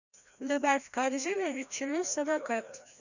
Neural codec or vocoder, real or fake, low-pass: codec, 16 kHz, 1 kbps, FreqCodec, larger model; fake; 7.2 kHz